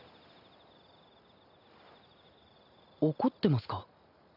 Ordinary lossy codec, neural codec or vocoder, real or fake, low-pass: none; none; real; 5.4 kHz